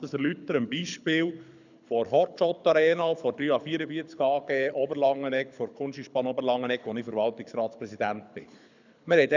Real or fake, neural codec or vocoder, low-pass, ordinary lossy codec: fake; codec, 24 kHz, 6 kbps, HILCodec; 7.2 kHz; none